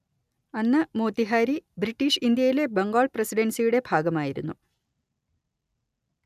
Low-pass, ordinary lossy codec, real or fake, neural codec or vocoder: 14.4 kHz; none; real; none